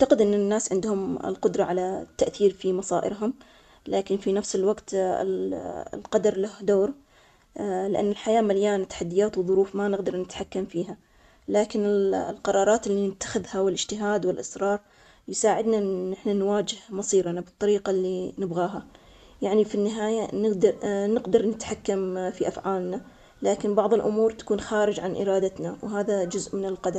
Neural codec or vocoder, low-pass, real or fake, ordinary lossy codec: none; 14.4 kHz; real; none